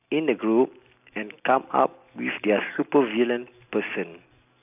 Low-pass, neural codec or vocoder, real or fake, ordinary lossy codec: 3.6 kHz; none; real; AAC, 32 kbps